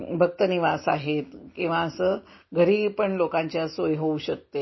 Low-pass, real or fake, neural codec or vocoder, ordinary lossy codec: 7.2 kHz; real; none; MP3, 24 kbps